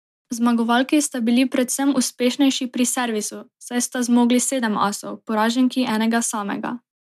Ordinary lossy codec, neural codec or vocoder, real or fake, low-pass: MP3, 96 kbps; none; real; 14.4 kHz